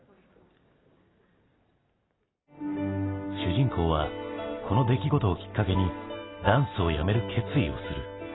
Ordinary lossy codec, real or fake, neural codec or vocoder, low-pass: AAC, 16 kbps; real; none; 7.2 kHz